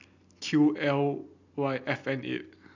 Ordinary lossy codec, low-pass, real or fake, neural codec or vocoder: MP3, 64 kbps; 7.2 kHz; real; none